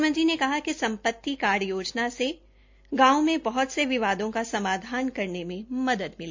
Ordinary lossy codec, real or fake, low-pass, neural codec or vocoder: MP3, 48 kbps; real; 7.2 kHz; none